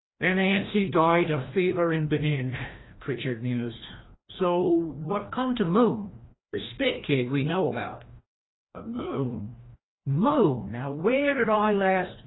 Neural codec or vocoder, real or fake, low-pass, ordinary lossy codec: codec, 16 kHz, 1 kbps, FreqCodec, larger model; fake; 7.2 kHz; AAC, 16 kbps